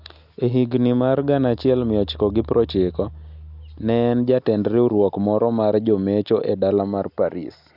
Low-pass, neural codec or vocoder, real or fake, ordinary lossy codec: 5.4 kHz; none; real; none